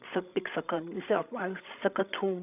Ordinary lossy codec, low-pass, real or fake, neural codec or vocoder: none; 3.6 kHz; fake; codec, 16 kHz, 16 kbps, FreqCodec, larger model